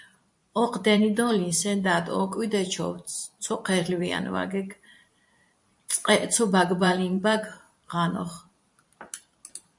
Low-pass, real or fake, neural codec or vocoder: 10.8 kHz; fake; vocoder, 44.1 kHz, 128 mel bands every 512 samples, BigVGAN v2